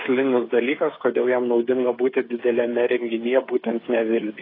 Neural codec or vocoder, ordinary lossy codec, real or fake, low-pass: codec, 16 kHz, 8 kbps, FreqCodec, smaller model; AAC, 24 kbps; fake; 5.4 kHz